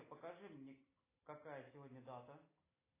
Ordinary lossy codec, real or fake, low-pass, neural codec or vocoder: AAC, 16 kbps; real; 3.6 kHz; none